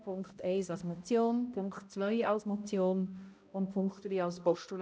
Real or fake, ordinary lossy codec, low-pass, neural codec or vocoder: fake; none; none; codec, 16 kHz, 0.5 kbps, X-Codec, HuBERT features, trained on balanced general audio